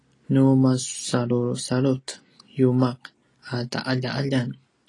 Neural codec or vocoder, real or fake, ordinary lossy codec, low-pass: none; real; AAC, 32 kbps; 10.8 kHz